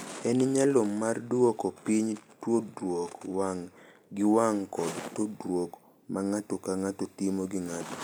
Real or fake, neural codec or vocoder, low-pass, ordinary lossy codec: real; none; none; none